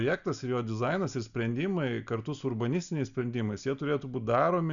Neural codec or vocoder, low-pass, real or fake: none; 7.2 kHz; real